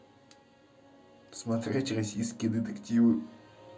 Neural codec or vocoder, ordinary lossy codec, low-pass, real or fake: none; none; none; real